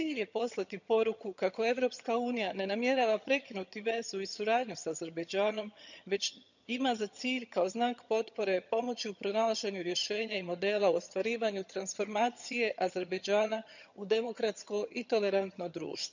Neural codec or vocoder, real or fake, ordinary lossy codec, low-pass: vocoder, 22.05 kHz, 80 mel bands, HiFi-GAN; fake; none; 7.2 kHz